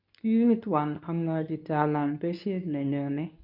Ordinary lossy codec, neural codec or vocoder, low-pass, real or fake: none; codec, 24 kHz, 0.9 kbps, WavTokenizer, medium speech release version 2; 5.4 kHz; fake